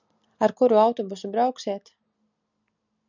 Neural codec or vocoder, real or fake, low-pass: none; real; 7.2 kHz